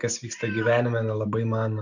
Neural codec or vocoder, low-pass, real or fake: none; 7.2 kHz; real